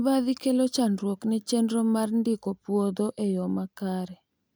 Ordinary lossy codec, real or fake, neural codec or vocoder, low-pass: none; real; none; none